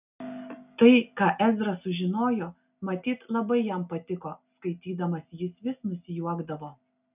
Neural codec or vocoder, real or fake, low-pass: none; real; 3.6 kHz